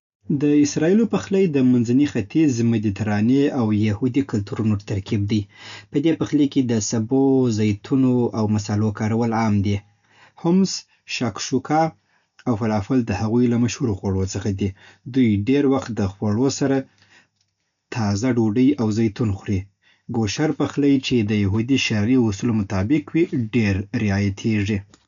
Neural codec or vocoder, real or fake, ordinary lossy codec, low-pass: none; real; MP3, 96 kbps; 7.2 kHz